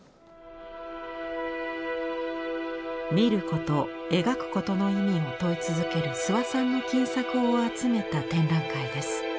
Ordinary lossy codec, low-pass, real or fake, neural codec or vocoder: none; none; real; none